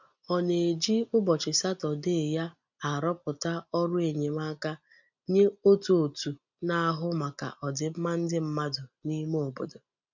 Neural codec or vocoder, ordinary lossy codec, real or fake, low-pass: none; none; real; 7.2 kHz